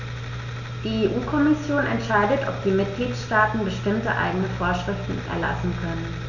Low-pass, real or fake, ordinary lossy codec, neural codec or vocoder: 7.2 kHz; real; none; none